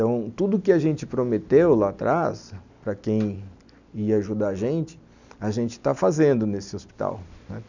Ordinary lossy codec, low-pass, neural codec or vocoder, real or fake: none; 7.2 kHz; none; real